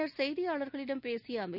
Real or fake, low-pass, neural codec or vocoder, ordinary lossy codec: real; 5.4 kHz; none; none